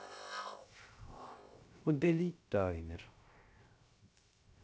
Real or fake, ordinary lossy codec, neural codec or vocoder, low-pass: fake; none; codec, 16 kHz, 0.3 kbps, FocalCodec; none